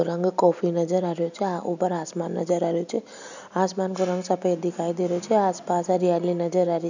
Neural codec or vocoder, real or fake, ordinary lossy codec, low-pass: none; real; none; 7.2 kHz